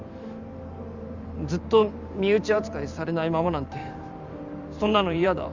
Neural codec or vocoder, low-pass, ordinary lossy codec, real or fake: vocoder, 44.1 kHz, 128 mel bands every 512 samples, BigVGAN v2; 7.2 kHz; none; fake